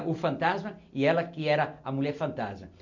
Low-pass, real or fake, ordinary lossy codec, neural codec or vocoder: 7.2 kHz; real; none; none